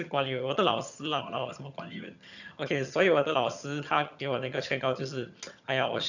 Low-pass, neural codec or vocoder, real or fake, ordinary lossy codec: 7.2 kHz; vocoder, 22.05 kHz, 80 mel bands, HiFi-GAN; fake; none